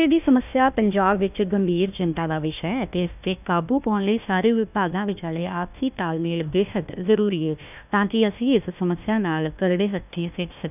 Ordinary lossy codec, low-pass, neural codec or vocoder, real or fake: none; 3.6 kHz; codec, 16 kHz, 1 kbps, FunCodec, trained on Chinese and English, 50 frames a second; fake